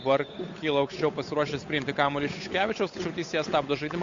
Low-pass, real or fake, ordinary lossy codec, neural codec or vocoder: 7.2 kHz; fake; AAC, 48 kbps; codec, 16 kHz, 8 kbps, FunCodec, trained on Chinese and English, 25 frames a second